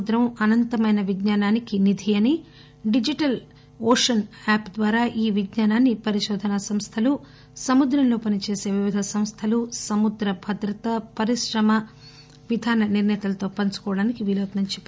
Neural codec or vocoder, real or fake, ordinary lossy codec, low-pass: none; real; none; none